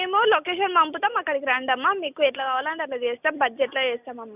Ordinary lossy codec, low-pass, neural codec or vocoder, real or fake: none; 3.6 kHz; none; real